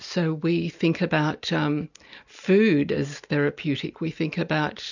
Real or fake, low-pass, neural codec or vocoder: real; 7.2 kHz; none